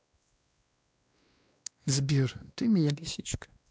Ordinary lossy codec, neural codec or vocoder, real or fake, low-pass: none; codec, 16 kHz, 1 kbps, X-Codec, WavLM features, trained on Multilingual LibriSpeech; fake; none